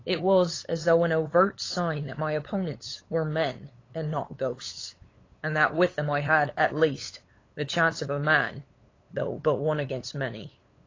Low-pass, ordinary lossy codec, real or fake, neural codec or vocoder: 7.2 kHz; AAC, 32 kbps; fake; codec, 16 kHz, 8 kbps, FunCodec, trained on LibriTTS, 25 frames a second